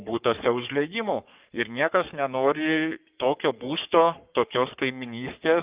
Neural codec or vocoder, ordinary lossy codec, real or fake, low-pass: codec, 44.1 kHz, 3.4 kbps, Pupu-Codec; Opus, 24 kbps; fake; 3.6 kHz